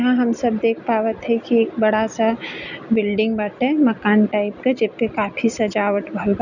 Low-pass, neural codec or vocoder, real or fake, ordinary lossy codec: 7.2 kHz; none; real; none